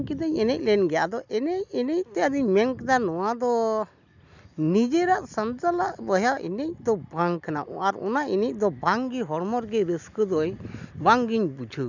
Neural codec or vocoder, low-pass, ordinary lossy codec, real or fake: none; 7.2 kHz; none; real